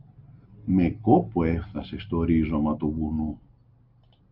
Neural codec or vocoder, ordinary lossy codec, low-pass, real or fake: none; Opus, 64 kbps; 5.4 kHz; real